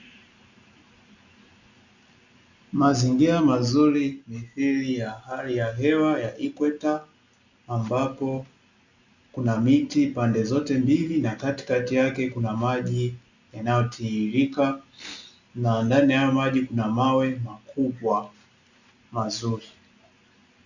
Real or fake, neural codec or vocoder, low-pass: real; none; 7.2 kHz